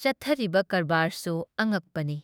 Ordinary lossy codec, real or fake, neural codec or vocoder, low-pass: none; fake; autoencoder, 48 kHz, 32 numbers a frame, DAC-VAE, trained on Japanese speech; none